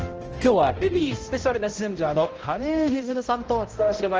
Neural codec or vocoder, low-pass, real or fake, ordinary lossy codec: codec, 16 kHz, 0.5 kbps, X-Codec, HuBERT features, trained on balanced general audio; 7.2 kHz; fake; Opus, 16 kbps